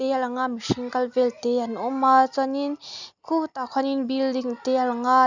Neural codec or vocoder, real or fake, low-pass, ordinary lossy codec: none; real; 7.2 kHz; none